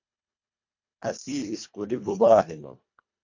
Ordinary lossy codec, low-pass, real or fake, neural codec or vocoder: MP3, 48 kbps; 7.2 kHz; fake; codec, 24 kHz, 1.5 kbps, HILCodec